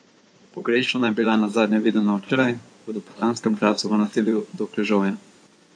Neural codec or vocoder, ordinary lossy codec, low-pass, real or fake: codec, 16 kHz in and 24 kHz out, 2.2 kbps, FireRedTTS-2 codec; AAC, 64 kbps; 9.9 kHz; fake